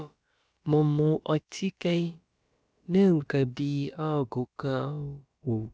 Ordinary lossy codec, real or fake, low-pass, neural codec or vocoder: none; fake; none; codec, 16 kHz, about 1 kbps, DyCAST, with the encoder's durations